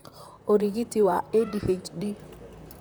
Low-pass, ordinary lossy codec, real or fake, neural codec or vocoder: none; none; fake; vocoder, 44.1 kHz, 128 mel bands, Pupu-Vocoder